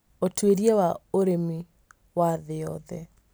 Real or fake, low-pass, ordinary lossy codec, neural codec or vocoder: real; none; none; none